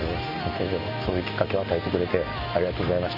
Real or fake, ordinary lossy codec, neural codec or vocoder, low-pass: real; none; none; 5.4 kHz